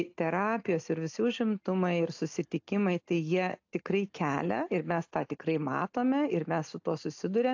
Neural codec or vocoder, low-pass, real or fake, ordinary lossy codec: none; 7.2 kHz; real; AAC, 48 kbps